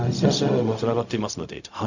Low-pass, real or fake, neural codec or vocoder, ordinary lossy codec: 7.2 kHz; fake; codec, 16 kHz, 0.4 kbps, LongCat-Audio-Codec; none